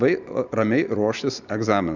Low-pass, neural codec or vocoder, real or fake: 7.2 kHz; none; real